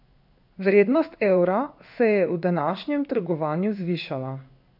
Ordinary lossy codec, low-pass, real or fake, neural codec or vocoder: none; 5.4 kHz; fake; codec, 16 kHz in and 24 kHz out, 1 kbps, XY-Tokenizer